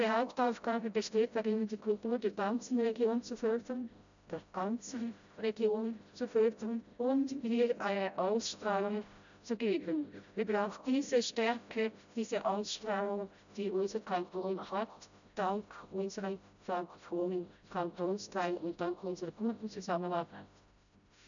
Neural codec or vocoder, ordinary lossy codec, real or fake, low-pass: codec, 16 kHz, 0.5 kbps, FreqCodec, smaller model; none; fake; 7.2 kHz